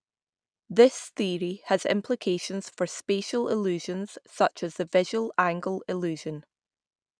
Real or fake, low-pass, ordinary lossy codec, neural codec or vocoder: real; 9.9 kHz; none; none